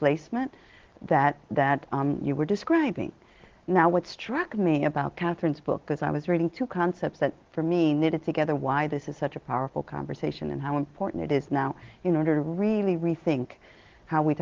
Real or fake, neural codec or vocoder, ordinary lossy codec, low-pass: real; none; Opus, 16 kbps; 7.2 kHz